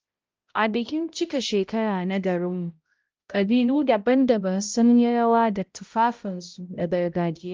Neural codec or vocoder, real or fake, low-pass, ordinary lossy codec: codec, 16 kHz, 0.5 kbps, X-Codec, HuBERT features, trained on balanced general audio; fake; 7.2 kHz; Opus, 24 kbps